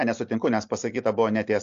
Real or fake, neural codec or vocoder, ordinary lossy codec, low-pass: real; none; AAC, 64 kbps; 7.2 kHz